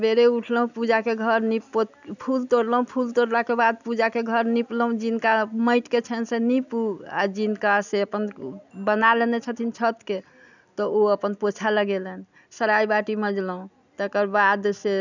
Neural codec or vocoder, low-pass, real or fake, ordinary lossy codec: none; 7.2 kHz; real; none